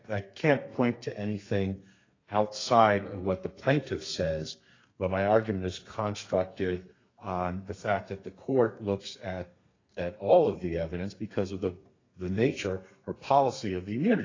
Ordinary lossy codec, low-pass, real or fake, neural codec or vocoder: AAC, 32 kbps; 7.2 kHz; fake; codec, 32 kHz, 1.9 kbps, SNAC